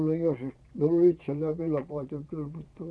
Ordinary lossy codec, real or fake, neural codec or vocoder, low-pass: none; fake; vocoder, 22.05 kHz, 80 mel bands, Vocos; none